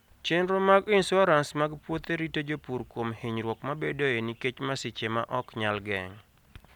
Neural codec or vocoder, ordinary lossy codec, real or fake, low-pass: none; none; real; 19.8 kHz